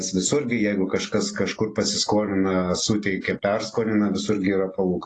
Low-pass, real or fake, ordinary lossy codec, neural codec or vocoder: 10.8 kHz; real; AAC, 32 kbps; none